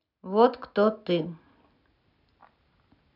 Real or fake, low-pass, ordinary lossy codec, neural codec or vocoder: real; 5.4 kHz; none; none